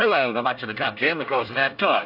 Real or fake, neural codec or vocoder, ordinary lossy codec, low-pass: fake; codec, 24 kHz, 1 kbps, SNAC; AAC, 32 kbps; 5.4 kHz